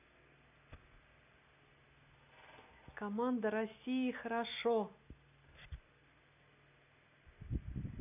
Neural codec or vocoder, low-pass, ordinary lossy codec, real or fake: none; 3.6 kHz; none; real